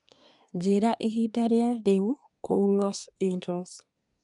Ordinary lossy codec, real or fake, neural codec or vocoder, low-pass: none; fake; codec, 24 kHz, 1 kbps, SNAC; 10.8 kHz